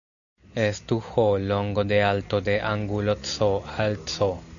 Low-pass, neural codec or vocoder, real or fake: 7.2 kHz; none; real